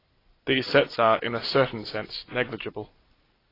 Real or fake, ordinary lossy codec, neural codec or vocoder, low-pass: real; AAC, 24 kbps; none; 5.4 kHz